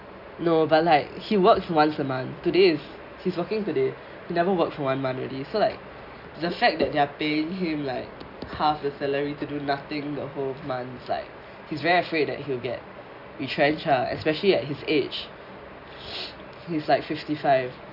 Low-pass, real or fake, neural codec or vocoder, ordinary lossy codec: 5.4 kHz; real; none; none